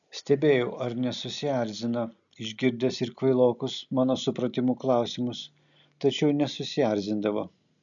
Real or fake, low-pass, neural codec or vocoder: real; 7.2 kHz; none